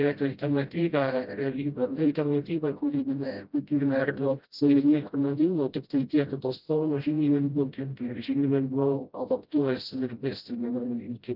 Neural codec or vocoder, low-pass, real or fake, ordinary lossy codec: codec, 16 kHz, 0.5 kbps, FreqCodec, smaller model; 5.4 kHz; fake; Opus, 32 kbps